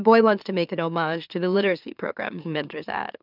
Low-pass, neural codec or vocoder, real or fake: 5.4 kHz; autoencoder, 44.1 kHz, a latent of 192 numbers a frame, MeloTTS; fake